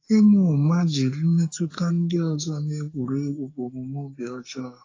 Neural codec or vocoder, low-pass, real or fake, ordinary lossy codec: codec, 44.1 kHz, 2.6 kbps, SNAC; 7.2 kHz; fake; AAC, 32 kbps